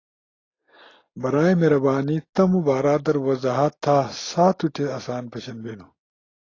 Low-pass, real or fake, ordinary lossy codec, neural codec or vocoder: 7.2 kHz; real; AAC, 32 kbps; none